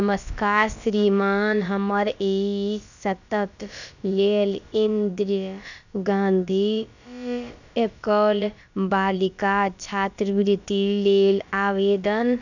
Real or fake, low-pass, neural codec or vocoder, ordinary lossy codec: fake; 7.2 kHz; codec, 16 kHz, about 1 kbps, DyCAST, with the encoder's durations; none